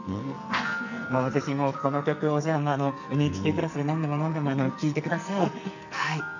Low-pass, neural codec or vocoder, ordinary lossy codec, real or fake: 7.2 kHz; codec, 44.1 kHz, 2.6 kbps, SNAC; none; fake